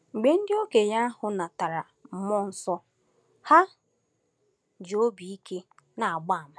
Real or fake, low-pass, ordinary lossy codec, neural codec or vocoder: real; none; none; none